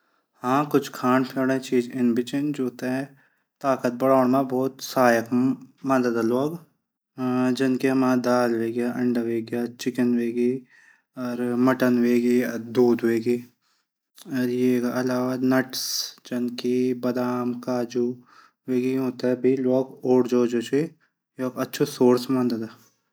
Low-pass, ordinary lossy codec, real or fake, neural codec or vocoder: none; none; real; none